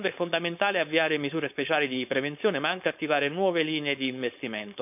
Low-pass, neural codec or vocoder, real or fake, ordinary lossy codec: 3.6 kHz; codec, 16 kHz, 4.8 kbps, FACodec; fake; none